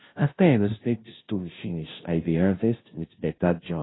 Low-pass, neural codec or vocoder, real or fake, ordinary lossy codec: 7.2 kHz; codec, 16 kHz, 0.5 kbps, FunCodec, trained on Chinese and English, 25 frames a second; fake; AAC, 16 kbps